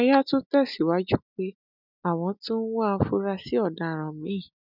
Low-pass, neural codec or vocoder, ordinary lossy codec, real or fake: 5.4 kHz; none; none; real